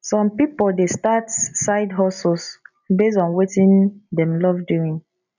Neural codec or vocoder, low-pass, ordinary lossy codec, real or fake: none; 7.2 kHz; none; real